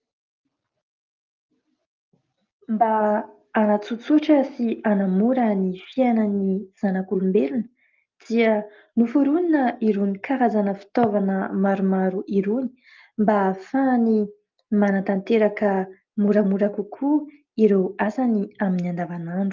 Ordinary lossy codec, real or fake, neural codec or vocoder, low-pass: Opus, 32 kbps; real; none; 7.2 kHz